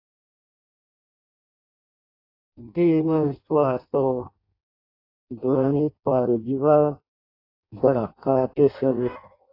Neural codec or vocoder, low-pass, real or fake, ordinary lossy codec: codec, 16 kHz in and 24 kHz out, 0.6 kbps, FireRedTTS-2 codec; 5.4 kHz; fake; AAC, 32 kbps